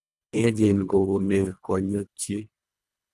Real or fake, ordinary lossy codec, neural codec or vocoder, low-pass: fake; none; codec, 24 kHz, 1.5 kbps, HILCodec; none